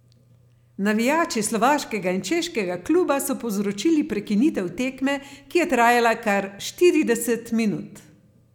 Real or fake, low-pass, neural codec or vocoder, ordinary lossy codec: real; 19.8 kHz; none; none